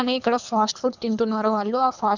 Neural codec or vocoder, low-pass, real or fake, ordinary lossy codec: codec, 24 kHz, 3 kbps, HILCodec; 7.2 kHz; fake; none